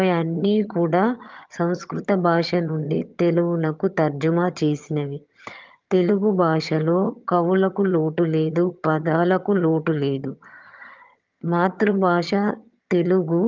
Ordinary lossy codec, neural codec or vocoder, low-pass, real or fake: Opus, 24 kbps; vocoder, 22.05 kHz, 80 mel bands, HiFi-GAN; 7.2 kHz; fake